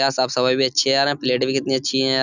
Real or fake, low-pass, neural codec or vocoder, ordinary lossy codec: real; 7.2 kHz; none; none